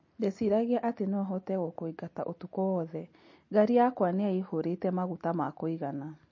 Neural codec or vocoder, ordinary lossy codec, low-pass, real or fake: none; MP3, 32 kbps; 7.2 kHz; real